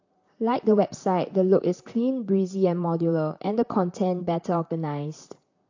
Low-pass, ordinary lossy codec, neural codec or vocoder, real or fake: 7.2 kHz; AAC, 48 kbps; vocoder, 44.1 kHz, 128 mel bands, Pupu-Vocoder; fake